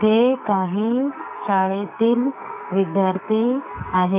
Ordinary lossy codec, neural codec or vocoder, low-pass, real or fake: none; codec, 44.1 kHz, 2.6 kbps, SNAC; 3.6 kHz; fake